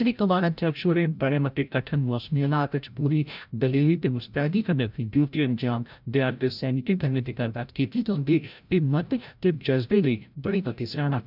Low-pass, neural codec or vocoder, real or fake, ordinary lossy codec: 5.4 kHz; codec, 16 kHz, 0.5 kbps, FreqCodec, larger model; fake; none